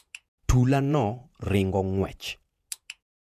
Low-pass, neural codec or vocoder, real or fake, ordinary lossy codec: 14.4 kHz; vocoder, 48 kHz, 128 mel bands, Vocos; fake; none